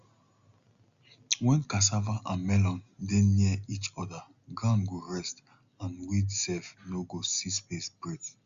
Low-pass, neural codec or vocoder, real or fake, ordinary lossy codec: 7.2 kHz; none; real; Opus, 64 kbps